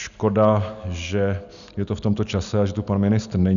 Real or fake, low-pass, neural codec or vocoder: real; 7.2 kHz; none